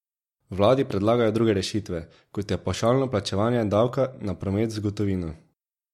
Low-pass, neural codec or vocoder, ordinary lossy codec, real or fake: 19.8 kHz; none; MP3, 64 kbps; real